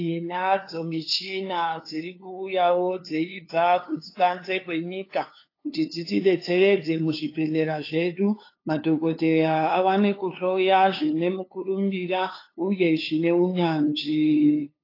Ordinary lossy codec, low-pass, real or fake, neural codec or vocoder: AAC, 32 kbps; 5.4 kHz; fake; codec, 16 kHz, 2 kbps, FunCodec, trained on LibriTTS, 25 frames a second